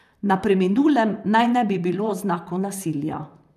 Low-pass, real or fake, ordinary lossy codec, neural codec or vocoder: 14.4 kHz; fake; none; vocoder, 44.1 kHz, 128 mel bands, Pupu-Vocoder